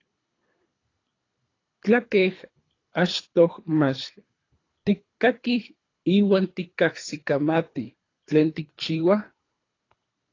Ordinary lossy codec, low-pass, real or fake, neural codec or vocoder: AAC, 32 kbps; 7.2 kHz; fake; codec, 24 kHz, 3 kbps, HILCodec